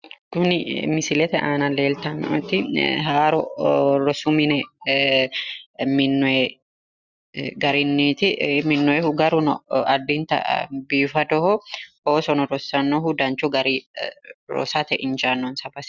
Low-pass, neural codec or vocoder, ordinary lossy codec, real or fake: 7.2 kHz; none; Opus, 64 kbps; real